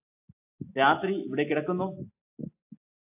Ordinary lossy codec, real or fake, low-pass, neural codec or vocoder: AAC, 32 kbps; real; 3.6 kHz; none